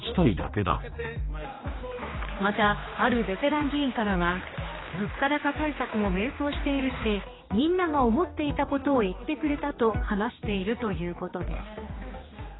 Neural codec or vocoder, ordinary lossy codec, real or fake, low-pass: codec, 16 kHz, 2 kbps, X-Codec, HuBERT features, trained on general audio; AAC, 16 kbps; fake; 7.2 kHz